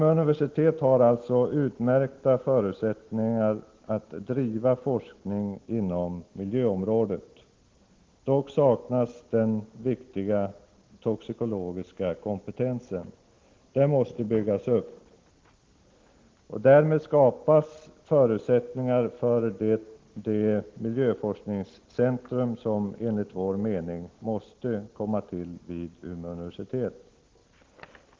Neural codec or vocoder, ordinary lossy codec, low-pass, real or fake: none; Opus, 16 kbps; 7.2 kHz; real